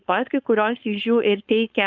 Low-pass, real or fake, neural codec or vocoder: 7.2 kHz; fake; codec, 16 kHz, 4.8 kbps, FACodec